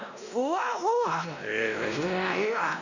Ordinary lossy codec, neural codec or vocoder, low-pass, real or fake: none; codec, 16 kHz, 1 kbps, X-Codec, WavLM features, trained on Multilingual LibriSpeech; 7.2 kHz; fake